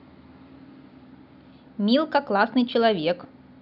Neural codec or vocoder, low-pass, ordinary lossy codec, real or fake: none; 5.4 kHz; none; real